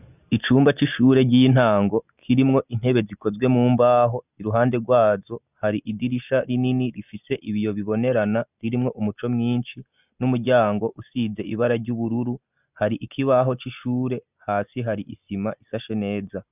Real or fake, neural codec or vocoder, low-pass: real; none; 3.6 kHz